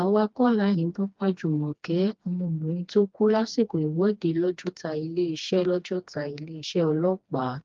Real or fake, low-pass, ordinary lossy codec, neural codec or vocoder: fake; 7.2 kHz; Opus, 32 kbps; codec, 16 kHz, 2 kbps, FreqCodec, smaller model